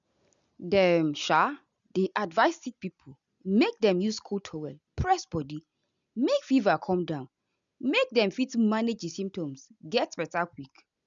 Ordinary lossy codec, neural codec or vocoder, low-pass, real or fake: none; none; 7.2 kHz; real